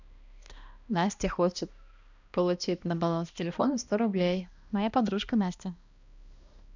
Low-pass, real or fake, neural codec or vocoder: 7.2 kHz; fake; codec, 16 kHz, 1 kbps, X-Codec, HuBERT features, trained on balanced general audio